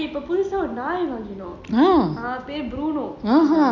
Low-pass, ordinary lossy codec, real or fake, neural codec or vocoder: 7.2 kHz; none; real; none